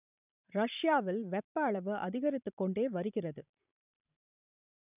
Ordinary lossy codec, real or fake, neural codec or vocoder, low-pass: none; fake; vocoder, 44.1 kHz, 128 mel bands every 512 samples, BigVGAN v2; 3.6 kHz